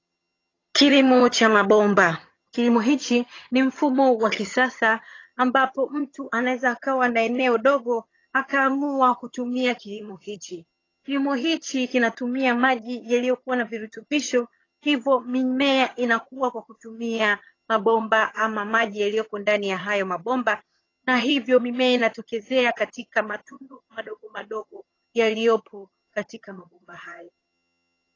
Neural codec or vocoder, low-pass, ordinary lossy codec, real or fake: vocoder, 22.05 kHz, 80 mel bands, HiFi-GAN; 7.2 kHz; AAC, 32 kbps; fake